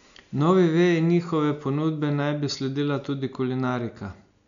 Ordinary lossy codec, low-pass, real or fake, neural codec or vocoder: none; 7.2 kHz; real; none